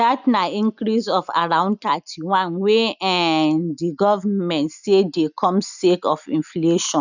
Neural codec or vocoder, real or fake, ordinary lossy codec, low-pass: none; real; none; 7.2 kHz